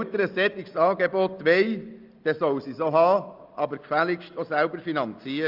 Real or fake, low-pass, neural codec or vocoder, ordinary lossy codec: real; 5.4 kHz; none; Opus, 24 kbps